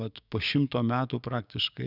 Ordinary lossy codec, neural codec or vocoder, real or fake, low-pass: Opus, 64 kbps; codec, 16 kHz, 6 kbps, DAC; fake; 5.4 kHz